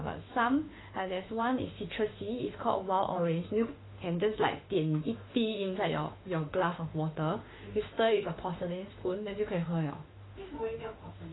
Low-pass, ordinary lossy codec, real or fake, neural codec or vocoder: 7.2 kHz; AAC, 16 kbps; fake; autoencoder, 48 kHz, 32 numbers a frame, DAC-VAE, trained on Japanese speech